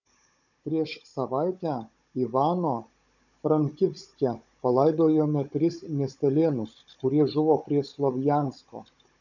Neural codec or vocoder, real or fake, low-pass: codec, 16 kHz, 16 kbps, FunCodec, trained on Chinese and English, 50 frames a second; fake; 7.2 kHz